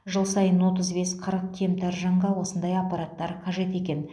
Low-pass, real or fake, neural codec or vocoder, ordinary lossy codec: none; real; none; none